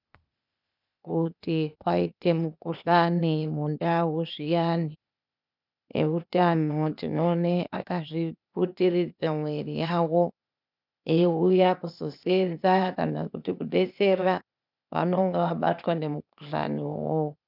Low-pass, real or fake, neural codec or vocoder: 5.4 kHz; fake; codec, 16 kHz, 0.8 kbps, ZipCodec